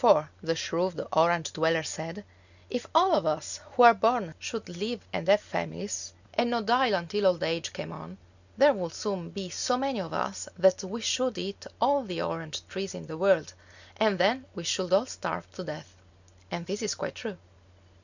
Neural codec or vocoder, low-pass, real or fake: none; 7.2 kHz; real